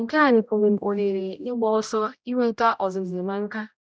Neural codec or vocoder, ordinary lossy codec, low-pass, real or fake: codec, 16 kHz, 0.5 kbps, X-Codec, HuBERT features, trained on general audio; none; none; fake